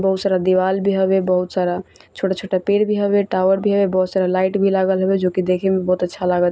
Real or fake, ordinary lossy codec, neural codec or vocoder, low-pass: real; none; none; none